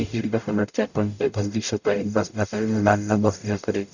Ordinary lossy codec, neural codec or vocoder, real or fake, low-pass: none; codec, 44.1 kHz, 0.9 kbps, DAC; fake; 7.2 kHz